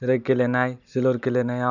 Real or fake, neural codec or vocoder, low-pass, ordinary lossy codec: real; none; 7.2 kHz; none